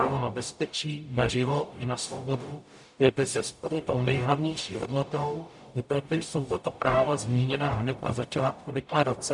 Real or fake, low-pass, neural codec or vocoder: fake; 10.8 kHz; codec, 44.1 kHz, 0.9 kbps, DAC